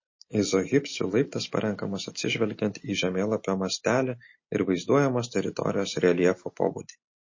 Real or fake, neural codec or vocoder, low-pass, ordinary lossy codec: real; none; 7.2 kHz; MP3, 32 kbps